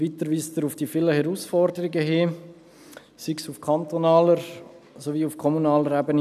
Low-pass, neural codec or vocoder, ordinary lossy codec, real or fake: 14.4 kHz; none; none; real